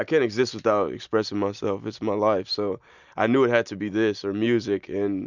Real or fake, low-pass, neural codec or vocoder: real; 7.2 kHz; none